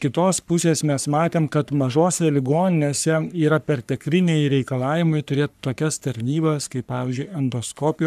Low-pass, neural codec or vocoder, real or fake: 14.4 kHz; codec, 44.1 kHz, 3.4 kbps, Pupu-Codec; fake